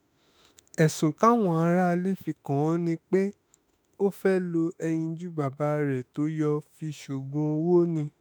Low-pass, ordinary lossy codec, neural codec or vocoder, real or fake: none; none; autoencoder, 48 kHz, 32 numbers a frame, DAC-VAE, trained on Japanese speech; fake